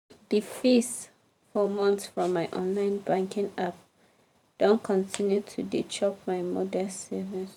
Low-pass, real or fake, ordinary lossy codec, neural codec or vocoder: 19.8 kHz; fake; none; vocoder, 44.1 kHz, 128 mel bands every 512 samples, BigVGAN v2